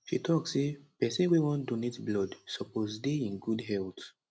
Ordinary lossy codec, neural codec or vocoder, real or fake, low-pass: none; none; real; none